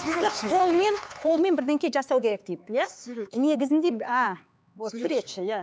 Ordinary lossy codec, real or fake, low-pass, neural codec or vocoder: none; fake; none; codec, 16 kHz, 4 kbps, X-Codec, HuBERT features, trained on LibriSpeech